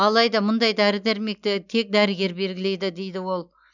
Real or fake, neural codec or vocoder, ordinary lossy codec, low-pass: real; none; none; 7.2 kHz